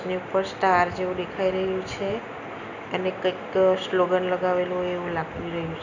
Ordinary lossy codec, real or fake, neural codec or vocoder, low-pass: none; real; none; 7.2 kHz